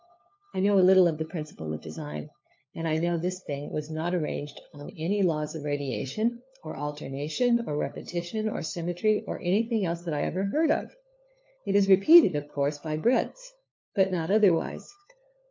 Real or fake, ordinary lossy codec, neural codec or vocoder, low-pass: fake; MP3, 48 kbps; codec, 16 kHz, 4 kbps, FunCodec, trained on LibriTTS, 50 frames a second; 7.2 kHz